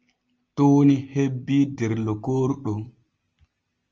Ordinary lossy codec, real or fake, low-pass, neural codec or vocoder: Opus, 24 kbps; real; 7.2 kHz; none